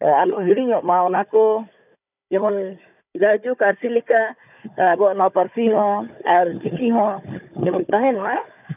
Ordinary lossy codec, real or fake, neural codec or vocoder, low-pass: none; fake; codec, 16 kHz, 4 kbps, FunCodec, trained on Chinese and English, 50 frames a second; 3.6 kHz